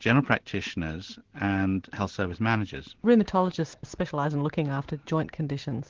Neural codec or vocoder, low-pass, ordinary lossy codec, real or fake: none; 7.2 kHz; Opus, 16 kbps; real